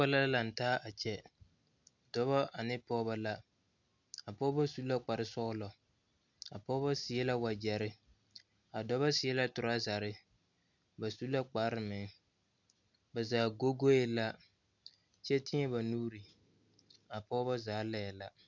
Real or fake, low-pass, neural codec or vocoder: real; 7.2 kHz; none